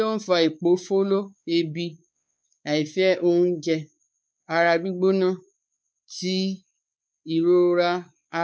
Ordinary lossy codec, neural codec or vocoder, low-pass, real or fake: none; codec, 16 kHz, 4 kbps, X-Codec, WavLM features, trained on Multilingual LibriSpeech; none; fake